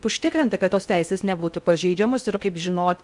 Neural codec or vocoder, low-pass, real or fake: codec, 16 kHz in and 24 kHz out, 0.6 kbps, FocalCodec, streaming, 4096 codes; 10.8 kHz; fake